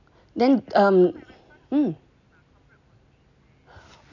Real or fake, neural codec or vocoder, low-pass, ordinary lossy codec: real; none; 7.2 kHz; none